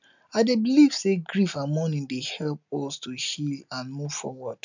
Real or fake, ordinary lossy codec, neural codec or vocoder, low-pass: real; none; none; 7.2 kHz